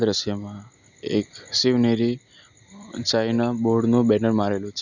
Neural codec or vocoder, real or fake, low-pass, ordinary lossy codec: none; real; 7.2 kHz; none